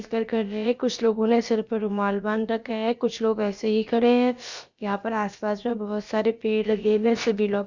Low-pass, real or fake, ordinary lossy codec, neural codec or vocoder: 7.2 kHz; fake; Opus, 64 kbps; codec, 16 kHz, about 1 kbps, DyCAST, with the encoder's durations